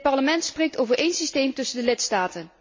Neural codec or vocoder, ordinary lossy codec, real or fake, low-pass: none; MP3, 32 kbps; real; 7.2 kHz